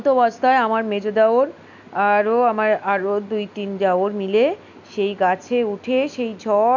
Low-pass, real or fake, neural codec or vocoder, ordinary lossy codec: 7.2 kHz; real; none; none